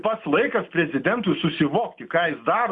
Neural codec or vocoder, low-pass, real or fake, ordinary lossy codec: none; 10.8 kHz; real; Opus, 64 kbps